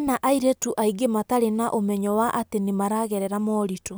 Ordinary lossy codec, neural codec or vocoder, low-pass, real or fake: none; none; none; real